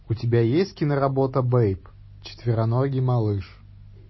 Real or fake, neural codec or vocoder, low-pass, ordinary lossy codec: real; none; 7.2 kHz; MP3, 24 kbps